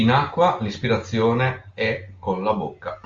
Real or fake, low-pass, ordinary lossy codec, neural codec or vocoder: real; 7.2 kHz; Opus, 32 kbps; none